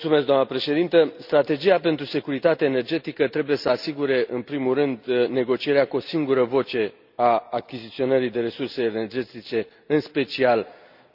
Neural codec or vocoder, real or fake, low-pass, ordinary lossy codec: none; real; 5.4 kHz; none